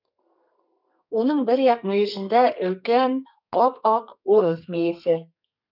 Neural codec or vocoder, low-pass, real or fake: codec, 32 kHz, 1.9 kbps, SNAC; 5.4 kHz; fake